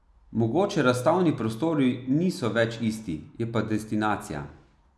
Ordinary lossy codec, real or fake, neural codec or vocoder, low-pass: none; real; none; none